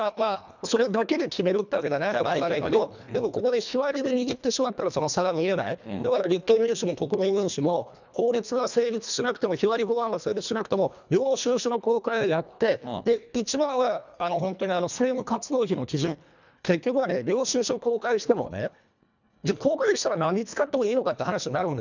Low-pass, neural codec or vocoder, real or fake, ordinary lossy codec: 7.2 kHz; codec, 24 kHz, 1.5 kbps, HILCodec; fake; none